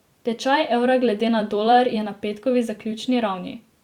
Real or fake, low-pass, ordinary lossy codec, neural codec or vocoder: fake; 19.8 kHz; Opus, 64 kbps; vocoder, 44.1 kHz, 128 mel bands every 256 samples, BigVGAN v2